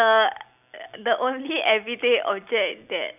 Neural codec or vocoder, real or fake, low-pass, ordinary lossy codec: none; real; 3.6 kHz; none